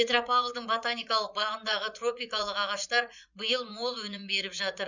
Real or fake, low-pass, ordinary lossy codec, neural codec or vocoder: fake; 7.2 kHz; none; vocoder, 44.1 kHz, 80 mel bands, Vocos